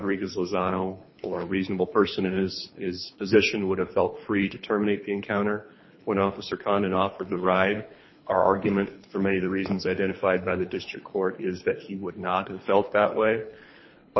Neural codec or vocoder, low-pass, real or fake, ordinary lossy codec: codec, 24 kHz, 3 kbps, HILCodec; 7.2 kHz; fake; MP3, 24 kbps